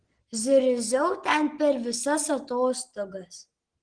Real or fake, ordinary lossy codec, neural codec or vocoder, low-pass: real; Opus, 16 kbps; none; 9.9 kHz